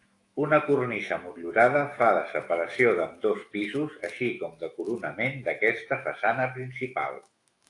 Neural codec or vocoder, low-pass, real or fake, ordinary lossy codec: autoencoder, 48 kHz, 128 numbers a frame, DAC-VAE, trained on Japanese speech; 10.8 kHz; fake; AAC, 48 kbps